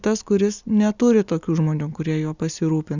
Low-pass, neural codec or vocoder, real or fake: 7.2 kHz; none; real